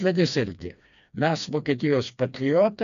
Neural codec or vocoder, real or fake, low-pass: codec, 16 kHz, 2 kbps, FreqCodec, smaller model; fake; 7.2 kHz